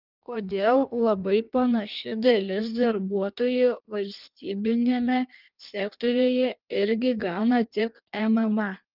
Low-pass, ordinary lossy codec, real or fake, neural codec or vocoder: 5.4 kHz; Opus, 24 kbps; fake; codec, 16 kHz in and 24 kHz out, 1.1 kbps, FireRedTTS-2 codec